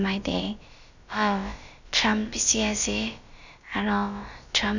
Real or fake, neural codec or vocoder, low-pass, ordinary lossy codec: fake; codec, 16 kHz, about 1 kbps, DyCAST, with the encoder's durations; 7.2 kHz; none